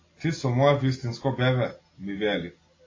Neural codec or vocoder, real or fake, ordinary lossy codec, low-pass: none; real; AAC, 32 kbps; 7.2 kHz